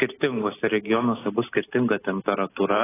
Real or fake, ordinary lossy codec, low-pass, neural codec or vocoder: real; AAC, 16 kbps; 3.6 kHz; none